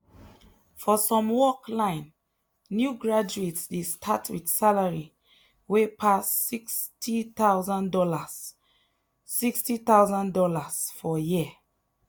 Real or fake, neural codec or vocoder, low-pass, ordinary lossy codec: real; none; none; none